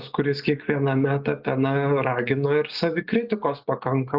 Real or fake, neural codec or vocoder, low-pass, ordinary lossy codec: real; none; 5.4 kHz; Opus, 32 kbps